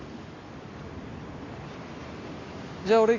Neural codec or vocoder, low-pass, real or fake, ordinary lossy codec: none; 7.2 kHz; real; AAC, 32 kbps